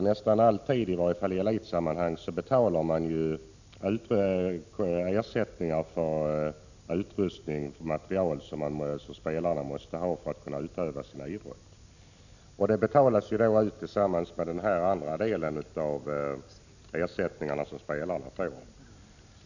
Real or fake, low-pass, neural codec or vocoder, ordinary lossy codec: real; 7.2 kHz; none; none